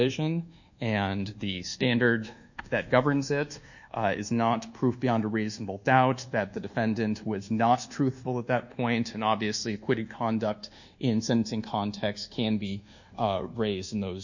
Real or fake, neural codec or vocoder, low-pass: fake; codec, 24 kHz, 1.2 kbps, DualCodec; 7.2 kHz